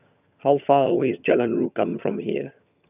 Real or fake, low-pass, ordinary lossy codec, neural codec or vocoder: fake; 3.6 kHz; none; vocoder, 22.05 kHz, 80 mel bands, HiFi-GAN